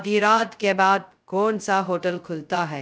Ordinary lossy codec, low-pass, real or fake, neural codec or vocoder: none; none; fake; codec, 16 kHz, 0.2 kbps, FocalCodec